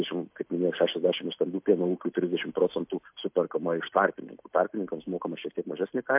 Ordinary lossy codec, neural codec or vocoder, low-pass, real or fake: MP3, 32 kbps; none; 3.6 kHz; real